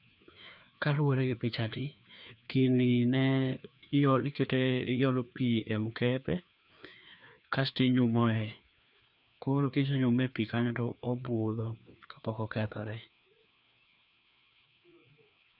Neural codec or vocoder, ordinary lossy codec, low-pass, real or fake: codec, 16 kHz, 2 kbps, FreqCodec, larger model; none; 5.4 kHz; fake